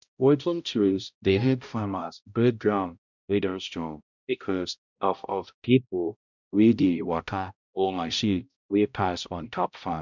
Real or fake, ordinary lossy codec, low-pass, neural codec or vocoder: fake; none; 7.2 kHz; codec, 16 kHz, 0.5 kbps, X-Codec, HuBERT features, trained on balanced general audio